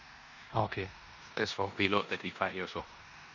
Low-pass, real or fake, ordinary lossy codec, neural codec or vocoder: 7.2 kHz; fake; none; codec, 16 kHz in and 24 kHz out, 0.9 kbps, LongCat-Audio-Codec, four codebook decoder